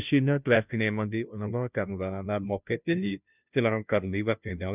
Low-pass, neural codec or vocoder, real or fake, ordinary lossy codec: 3.6 kHz; codec, 16 kHz, 0.5 kbps, FunCodec, trained on Chinese and English, 25 frames a second; fake; none